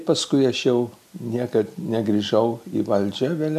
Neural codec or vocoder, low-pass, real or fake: none; 14.4 kHz; real